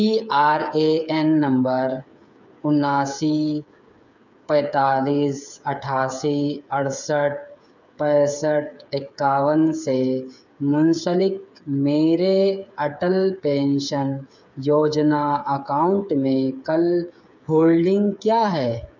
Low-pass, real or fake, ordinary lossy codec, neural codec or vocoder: 7.2 kHz; fake; none; codec, 16 kHz, 8 kbps, FreqCodec, smaller model